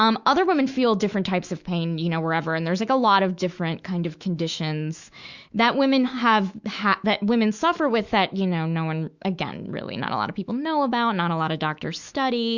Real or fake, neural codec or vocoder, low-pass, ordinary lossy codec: fake; autoencoder, 48 kHz, 128 numbers a frame, DAC-VAE, trained on Japanese speech; 7.2 kHz; Opus, 64 kbps